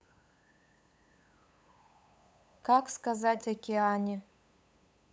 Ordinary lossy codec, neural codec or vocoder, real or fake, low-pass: none; codec, 16 kHz, 8 kbps, FunCodec, trained on LibriTTS, 25 frames a second; fake; none